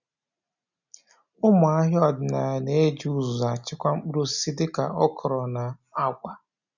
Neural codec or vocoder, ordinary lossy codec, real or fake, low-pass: none; none; real; 7.2 kHz